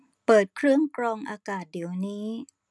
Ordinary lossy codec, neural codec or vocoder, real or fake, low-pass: none; none; real; none